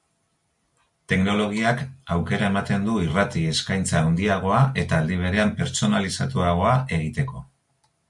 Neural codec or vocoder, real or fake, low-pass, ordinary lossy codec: none; real; 10.8 kHz; MP3, 64 kbps